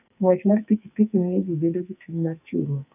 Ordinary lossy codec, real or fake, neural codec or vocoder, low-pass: none; fake; codec, 44.1 kHz, 2.6 kbps, SNAC; 3.6 kHz